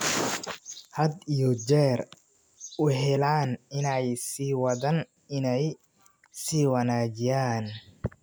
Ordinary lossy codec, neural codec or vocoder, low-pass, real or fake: none; none; none; real